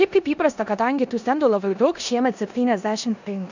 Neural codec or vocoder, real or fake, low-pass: codec, 16 kHz in and 24 kHz out, 0.9 kbps, LongCat-Audio-Codec, four codebook decoder; fake; 7.2 kHz